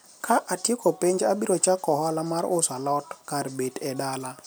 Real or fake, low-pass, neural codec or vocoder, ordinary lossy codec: real; none; none; none